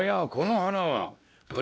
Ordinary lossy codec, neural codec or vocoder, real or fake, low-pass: none; codec, 16 kHz, 1 kbps, X-Codec, WavLM features, trained on Multilingual LibriSpeech; fake; none